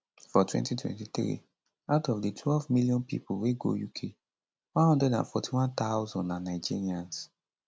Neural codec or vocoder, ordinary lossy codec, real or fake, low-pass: none; none; real; none